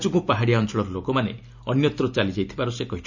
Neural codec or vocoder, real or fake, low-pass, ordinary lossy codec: none; real; 7.2 kHz; none